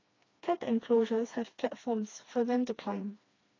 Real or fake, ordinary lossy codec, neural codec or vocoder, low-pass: fake; AAC, 32 kbps; codec, 16 kHz, 2 kbps, FreqCodec, smaller model; 7.2 kHz